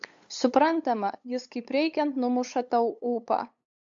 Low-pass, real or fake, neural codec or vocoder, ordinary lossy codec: 7.2 kHz; fake; codec, 16 kHz, 8 kbps, FunCodec, trained on LibriTTS, 25 frames a second; AAC, 64 kbps